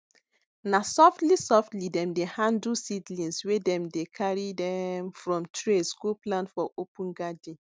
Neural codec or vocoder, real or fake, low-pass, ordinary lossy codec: none; real; none; none